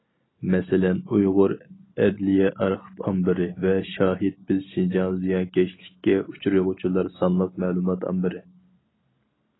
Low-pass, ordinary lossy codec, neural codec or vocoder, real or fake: 7.2 kHz; AAC, 16 kbps; none; real